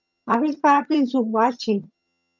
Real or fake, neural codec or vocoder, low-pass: fake; vocoder, 22.05 kHz, 80 mel bands, HiFi-GAN; 7.2 kHz